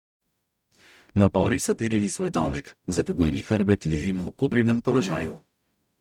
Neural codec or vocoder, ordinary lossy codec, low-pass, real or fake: codec, 44.1 kHz, 0.9 kbps, DAC; none; 19.8 kHz; fake